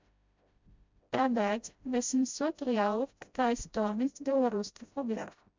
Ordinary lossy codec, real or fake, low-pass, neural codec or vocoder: MP3, 64 kbps; fake; 7.2 kHz; codec, 16 kHz, 0.5 kbps, FreqCodec, smaller model